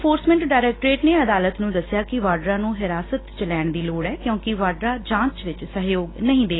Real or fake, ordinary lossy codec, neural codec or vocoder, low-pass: real; AAC, 16 kbps; none; 7.2 kHz